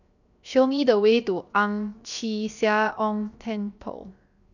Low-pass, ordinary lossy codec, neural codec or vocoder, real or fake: 7.2 kHz; none; codec, 16 kHz, 0.7 kbps, FocalCodec; fake